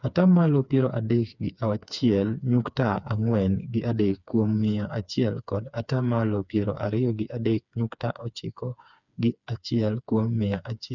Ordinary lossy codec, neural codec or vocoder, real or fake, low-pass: none; codec, 16 kHz, 4 kbps, FreqCodec, smaller model; fake; 7.2 kHz